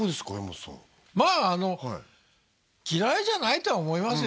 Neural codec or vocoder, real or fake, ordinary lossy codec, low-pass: none; real; none; none